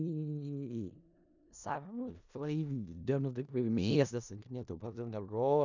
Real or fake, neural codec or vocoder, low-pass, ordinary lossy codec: fake; codec, 16 kHz in and 24 kHz out, 0.4 kbps, LongCat-Audio-Codec, four codebook decoder; 7.2 kHz; none